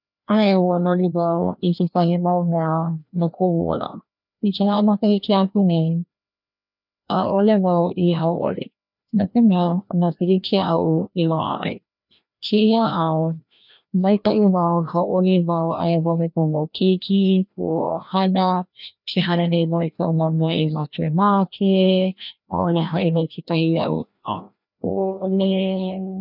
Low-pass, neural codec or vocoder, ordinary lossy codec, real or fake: 5.4 kHz; codec, 16 kHz, 1 kbps, FreqCodec, larger model; none; fake